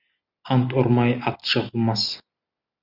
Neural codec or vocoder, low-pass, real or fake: none; 5.4 kHz; real